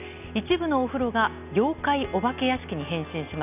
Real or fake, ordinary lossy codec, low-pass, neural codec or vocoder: real; none; 3.6 kHz; none